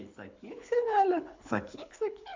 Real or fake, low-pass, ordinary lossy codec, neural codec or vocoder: fake; 7.2 kHz; MP3, 64 kbps; codec, 24 kHz, 6 kbps, HILCodec